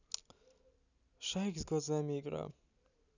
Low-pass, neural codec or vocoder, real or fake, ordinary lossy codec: 7.2 kHz; none; real; none